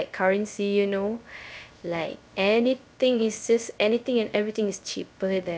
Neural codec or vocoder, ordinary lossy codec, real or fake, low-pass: codec, 16 kHz, 0.3 kbps, FocalCodec; none; fake; none